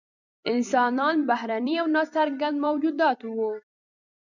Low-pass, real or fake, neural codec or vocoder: 7.2 kHz; fake; vocoder, 44.1 kHz, 128 mel bands every 256 samples, BigVGAN v2